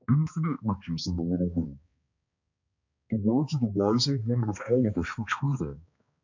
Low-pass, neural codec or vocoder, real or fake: 7.2 kHz; codec, 16 kHz, 2 kbps, X-Codec, HuBERT features, trained on balanced general audio; fake